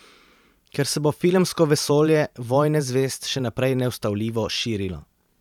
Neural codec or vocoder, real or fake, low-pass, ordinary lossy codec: vocoder, 44.1 kHz, 128 mel bands every 512 samples, BigVGAN v2; fake; 19.8 kHz; none